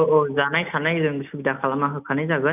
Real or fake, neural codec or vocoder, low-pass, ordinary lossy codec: real; none; 3.6 kHz; none